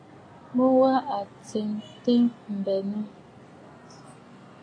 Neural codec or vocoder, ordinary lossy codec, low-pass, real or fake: none; AAC, 32 kbps; 9.9 kHz; real